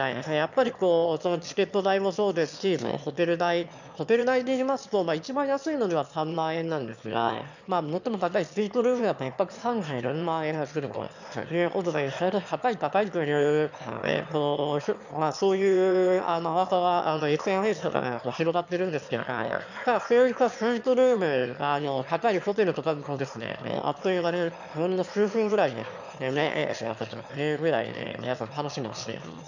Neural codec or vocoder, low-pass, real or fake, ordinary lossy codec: autoencoder, 22.05 kHz, a latent of 192 numbers a frame, VITS, trained on one speaker; 7.2 kHz; fake; none